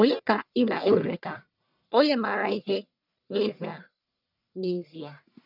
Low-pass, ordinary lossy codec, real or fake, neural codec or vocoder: 5.4 kHz; none; fake; codec, 44.1 kHz, 1.7 kbps, Pupu-Codec